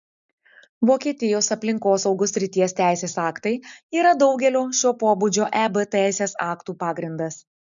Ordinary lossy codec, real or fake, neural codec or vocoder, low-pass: MP3, 96 kbps; real; none; 7.2 kHz